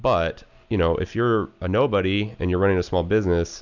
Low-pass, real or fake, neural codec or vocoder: 7.2 kHz; real; none